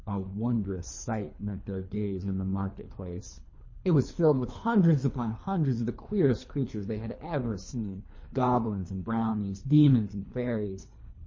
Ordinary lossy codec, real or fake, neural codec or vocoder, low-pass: MP3, 32 kbps; fake; codec, 24 kHz, 3 kbps, HILCodec; 7.2 kHz